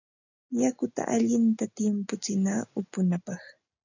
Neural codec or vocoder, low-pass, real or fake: none; 7.2 kHz; real